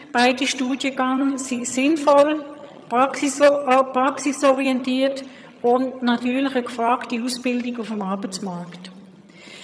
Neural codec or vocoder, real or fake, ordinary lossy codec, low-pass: vocoder, 22.05 kHz, 80 mel bands, HiFi-GAN; fake; none; none